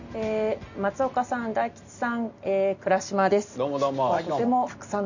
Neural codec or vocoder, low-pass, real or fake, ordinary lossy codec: none; 7.2 kHz; real; none